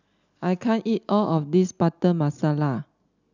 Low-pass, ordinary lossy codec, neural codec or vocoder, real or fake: 7.2 kHz; none; none; real